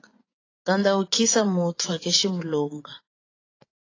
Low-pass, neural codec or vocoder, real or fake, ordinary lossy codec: 7.2 kHz; vocoder, 24 kHz, 100 mel bands, Vocos; fake; AAC, 32 kbps